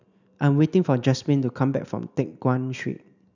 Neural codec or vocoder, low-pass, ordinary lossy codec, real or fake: none; 7.2 kHz; none; real